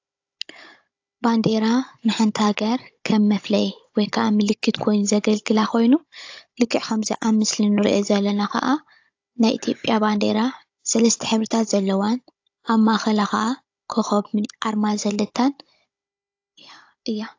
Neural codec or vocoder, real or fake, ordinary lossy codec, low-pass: codec, 16 kHz, 16 kbps, FunCodec, trained on Chinese and English, 50 frames a second; fake; AAC, 48 kbps; 7.2 kHz